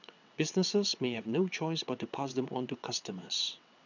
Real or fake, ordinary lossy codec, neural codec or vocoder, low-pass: fake; none; vocoder, 22.05 kHz, 80 mel bands, WaveNeXt; 7.2 kHz